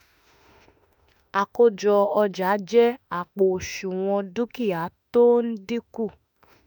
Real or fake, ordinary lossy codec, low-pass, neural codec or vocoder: fake; none; 19.8 kHz; autoencoder, 48 kHz, 32 numbers a frame, DAC-VAE, trained on Japanese speech